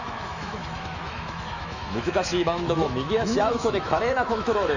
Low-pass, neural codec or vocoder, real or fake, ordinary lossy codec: 7.2 kHz; autoencoder, 48 kHz, 128 numbers a frame, DAC-VAE, trained on Japanese speech; fake; none